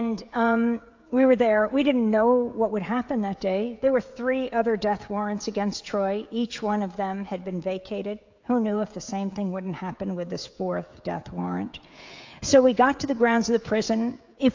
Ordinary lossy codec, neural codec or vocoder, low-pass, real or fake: AAC, 48 kbps; codec, 16 kHz, 16 kbps, FreqCodec, smaller model; 7.2 kHz; fake